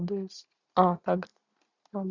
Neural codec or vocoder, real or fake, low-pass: none; real; 7.2 kHz